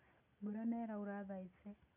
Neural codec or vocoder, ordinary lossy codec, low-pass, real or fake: none; none; 3.6 kHz; real